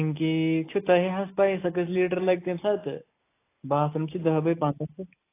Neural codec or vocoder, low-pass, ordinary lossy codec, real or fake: none; 3.6 kHz; AAC, 24 kbps; real